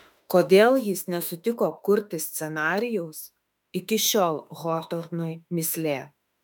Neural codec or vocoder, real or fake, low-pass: autoencoder, 48 kHz, 32 numbers a frame, DAC-VAE, trained on Japanese speech; fake; 19.8 kHz